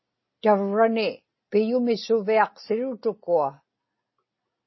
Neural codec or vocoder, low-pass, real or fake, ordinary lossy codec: none; 7.2 kHz; real; MP3, 24 kbps